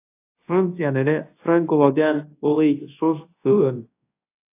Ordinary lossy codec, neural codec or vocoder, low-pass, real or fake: AAC, 24 kbps; codec, 24 kHz, 0.9 kbps, WavTokenizer, large speech release; 3.6 kHz; fake